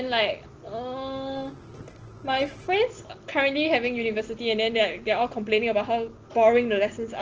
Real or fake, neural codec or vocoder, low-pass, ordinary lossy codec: real; none; 7.2 kHz; Opus, 16 kbps